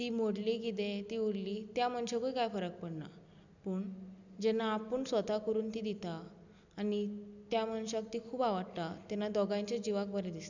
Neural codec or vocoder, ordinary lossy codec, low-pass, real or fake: none; none; 7.2 kHz; real